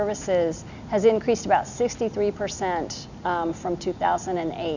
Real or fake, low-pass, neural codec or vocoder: real; 7.2 kHz; none